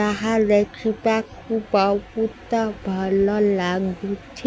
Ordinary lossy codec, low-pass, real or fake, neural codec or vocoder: none; none; real; none